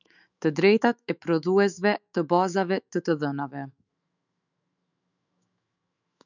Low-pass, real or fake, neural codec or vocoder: 7.2 kHz; fake; autoencoder, 48 kHz, 128 numbers a frame, DAC-VAE, trained on Japanese speech